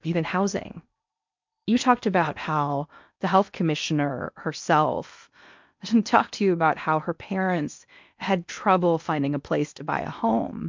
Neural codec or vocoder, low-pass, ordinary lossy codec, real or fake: codec, 16 kHz in and 24 kHz out, 0.8 kbps, FocalCodec, streaming, 65536 codes; 7.2 kHz; MP3, 64 kbps; fake